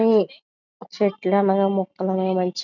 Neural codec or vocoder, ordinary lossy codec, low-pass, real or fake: none; none; 7.2 kHz; real